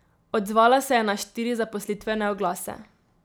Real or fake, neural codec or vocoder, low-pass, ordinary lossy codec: real; none; none; none